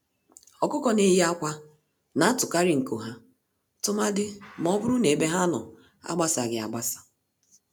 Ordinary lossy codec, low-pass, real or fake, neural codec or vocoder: none; none; real; none